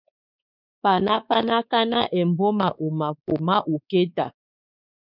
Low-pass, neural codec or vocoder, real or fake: 5.4 kHz; codec, 16 kHz, 4 kbps, X-Codec, WavLM features, trained on Multilingual LibriSpeech; fake